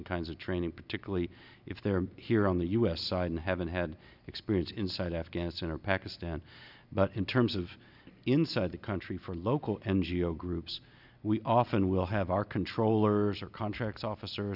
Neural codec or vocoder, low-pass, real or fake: none; 5.4 kHz; real